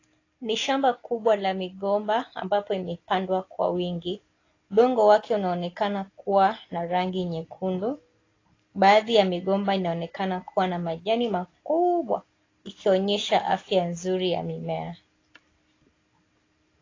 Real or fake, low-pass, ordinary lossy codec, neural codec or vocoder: real; 7.2 kHz; AAC, 32 kbps; none